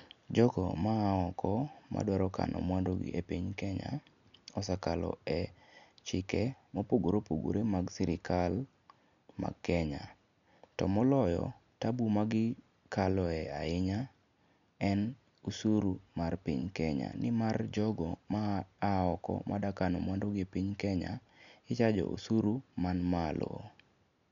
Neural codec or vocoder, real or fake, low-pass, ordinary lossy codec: none; real; 7.2 kHz; none